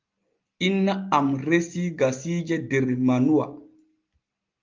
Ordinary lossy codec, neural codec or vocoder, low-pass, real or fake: Opus, 32 kbps; none; 7.2 kHz; real